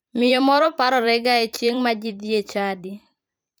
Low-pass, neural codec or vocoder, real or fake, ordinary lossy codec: none; vocoder, 44.1 kHz, 128 mel bands every 512 samples, BigVGAN v2; fake; none